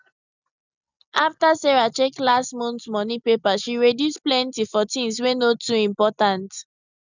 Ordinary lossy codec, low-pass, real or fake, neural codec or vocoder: none; 7.2 kHz; real; none